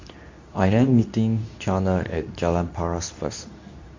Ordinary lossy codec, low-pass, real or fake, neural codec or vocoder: MP3, 48 kbps; 7.2 kHz; fake; codec, 24 kHz, 0.9 kbps, WavTokenizer, medium speech release version 2